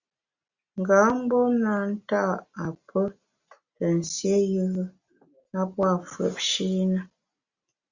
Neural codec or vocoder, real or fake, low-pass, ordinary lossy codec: none; real; 7.2 kHz; Opus, 64 kbps